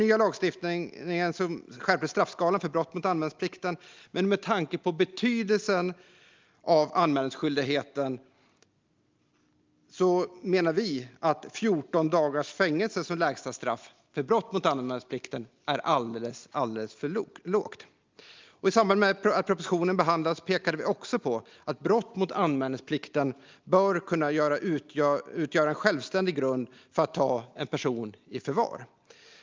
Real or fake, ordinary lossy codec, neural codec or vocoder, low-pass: real; Opus, 24 kbps; none; 7.2 kHz